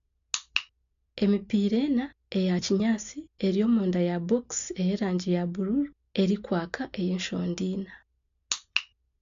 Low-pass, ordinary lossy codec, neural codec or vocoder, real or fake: 7.2 kHz; AAC, 64 kbps; none; real